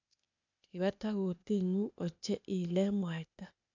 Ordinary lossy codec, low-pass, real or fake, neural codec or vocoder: none; 7.2 kHz; fake; codec, 16 kHz, 0.8 kbps, ZipCodec